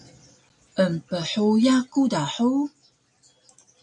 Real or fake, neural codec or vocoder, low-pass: real; none; 10.8 kHz